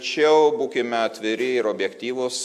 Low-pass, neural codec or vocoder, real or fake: 14.4 kHz; none; real